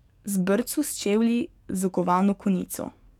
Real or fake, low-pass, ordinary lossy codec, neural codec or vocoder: fake; 19.8 kHz; none; codec, 44.1 kHz, 7.8 kbps, DAC